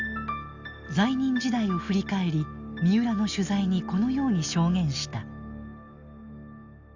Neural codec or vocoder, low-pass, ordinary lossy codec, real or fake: none; 7.2 kHz; Opus, 64 kbps; real